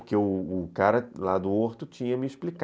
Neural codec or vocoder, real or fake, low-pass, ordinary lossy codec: none; real; none; none